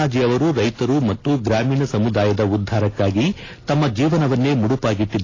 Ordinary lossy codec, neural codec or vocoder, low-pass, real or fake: AAC, 32 kbps; none; 7.2 kHz; real